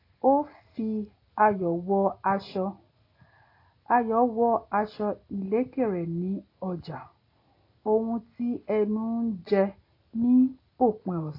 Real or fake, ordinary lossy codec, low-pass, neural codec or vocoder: real; AAC, 32 kbps; 5.4 kHz; none